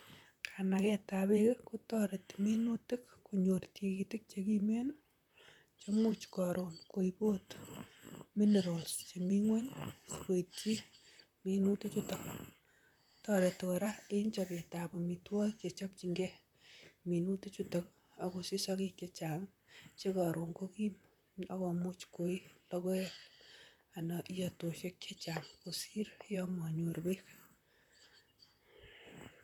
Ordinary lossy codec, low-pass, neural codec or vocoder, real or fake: none; 19.8 kHz; vocoder, 44.1 kHz, 128 mel bands, Pupu-Vocoder; fake